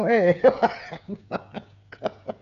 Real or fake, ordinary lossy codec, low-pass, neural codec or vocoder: fake; none; 7.2 kHz; codec, 16 kHz, 8 kbps, FreqCodec, smaller model